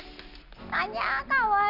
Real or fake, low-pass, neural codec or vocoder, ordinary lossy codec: real; 5.4 kHz; none; none